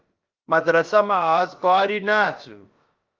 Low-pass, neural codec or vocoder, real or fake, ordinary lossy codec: 7.2 kHz; codec, 16 kHz, about 1 kbps, DyCAST, with the encoder's durations; fake; Opus, 32 kbps